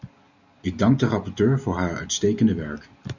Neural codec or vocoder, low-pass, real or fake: none; 7.2 kHz; real